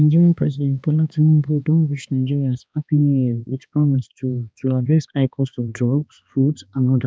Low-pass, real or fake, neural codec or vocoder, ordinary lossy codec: none; fake; codec, 16 kHz, 2 kbps, X-Codec, HuBERT features, trained on balanced general audio; none